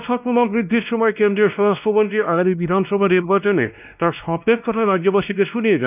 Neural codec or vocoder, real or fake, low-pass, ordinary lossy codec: codec, 16 kHz, 1 kbps, X-Codec, WavLM features, trained on Multilingual LibriSpeech; fake; 3.6 kHz; none